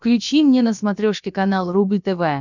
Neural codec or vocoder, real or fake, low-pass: codec, 16 kHz, about 1 kbps, DyCAST, with the encoder's durations; fake; 7.2 kHz